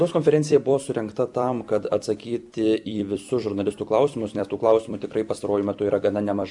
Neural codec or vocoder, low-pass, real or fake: vocoder, 44.1 kHz, 128 mel bands every 256 samples, BigVGAN v2; 10.8 kHz; fake